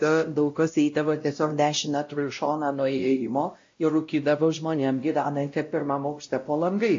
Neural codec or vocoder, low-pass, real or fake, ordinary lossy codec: codec, 16 kHz, 0.5 kbps, X-Codec, WavLM features, trained on Multilingual LibriSpeech; 7.2 kHz; fake; AAC, 48 kbps